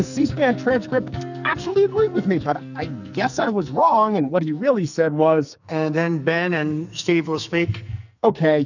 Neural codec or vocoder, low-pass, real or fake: codec, 44.1 kHz, 2.6 kbps, SNAC; 7.2 kHz; fake